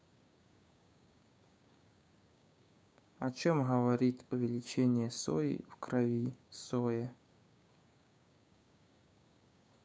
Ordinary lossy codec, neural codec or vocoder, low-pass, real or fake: none; codec, 16 kHz, 6 kbps, DAC; none; fake